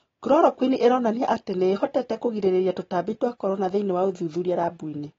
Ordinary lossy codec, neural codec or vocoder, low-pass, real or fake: AAC, 24 kbps; none; 7.2 kHz; real